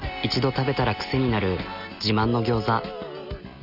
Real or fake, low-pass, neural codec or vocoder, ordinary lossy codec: real; 5.4 kHz; none; none